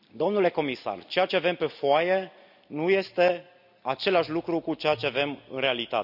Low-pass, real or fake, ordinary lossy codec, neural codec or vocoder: 5.4 kHz; real; none; none